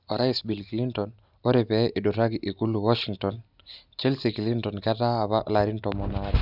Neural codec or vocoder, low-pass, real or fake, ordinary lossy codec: none; 5.4 kHz; real; none